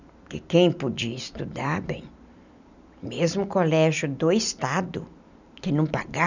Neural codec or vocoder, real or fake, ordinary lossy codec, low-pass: none; real; none; 7.2 kHz